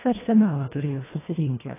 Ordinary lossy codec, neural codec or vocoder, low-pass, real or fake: AAC, 16 kbps; codec, 24 kHz, 1.5 kbps, HILCodec; 3.6 kHz; fake